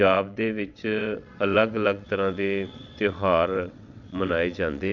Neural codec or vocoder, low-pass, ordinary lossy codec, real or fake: vocoder, 22.05 kHz, 80 mel bands, WaveNeXt; 7.2 kHz; none; fake